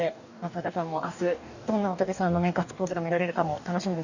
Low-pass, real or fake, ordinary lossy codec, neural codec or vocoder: 7.2 kHz; fake; none; codec, 44.1 kHz, 2.6 kbps, DAC